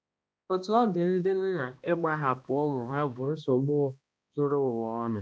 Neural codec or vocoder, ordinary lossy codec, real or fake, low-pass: codec, 16 kHz, 1 kbps, X-Codec, HuBERT features, trained on balanced general audio; none; fake; none